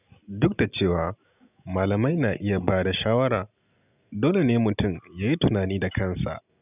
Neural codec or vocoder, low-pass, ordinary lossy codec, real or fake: none; 3.6 kHz; none; real